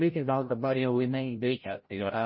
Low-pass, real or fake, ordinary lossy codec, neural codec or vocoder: 7.2 kHz; fake; MP3, 24 kbps; codec, 16 kHz, 0.5 kbps, FreqCodec, larger model